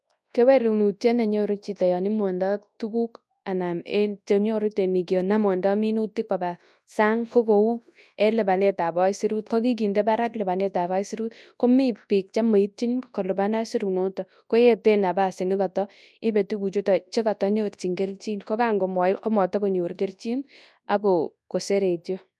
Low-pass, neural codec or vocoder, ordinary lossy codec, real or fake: none; codec, 24 kHz, 0.9 kbps, WavTokenizer, large speech release; none; fake